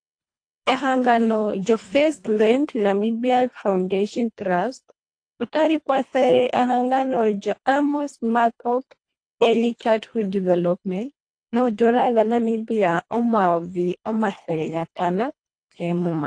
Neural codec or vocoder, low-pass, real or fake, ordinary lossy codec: codec, 24 kHz, 1.5 kbps, HILCodec; 9.9 kHz; fake; AAC, 48 kbps